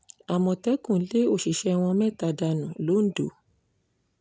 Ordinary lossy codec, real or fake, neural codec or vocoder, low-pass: none; real; none; none